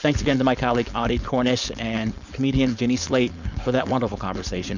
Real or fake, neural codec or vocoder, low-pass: fake; codec, 16 kHz, 4.8 kbps, FACodec; 7.2 kHz